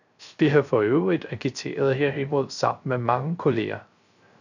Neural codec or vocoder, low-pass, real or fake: codec, 16 kHz, 0.3 kbps, FocalCodec; 7.2 kHz; fake